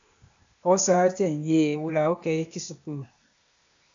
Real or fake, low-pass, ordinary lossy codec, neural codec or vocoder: fake; 7.2 kHz; AAC, 64 kbps; codec, 16 kHz, 0.8 kbps, ZipCodec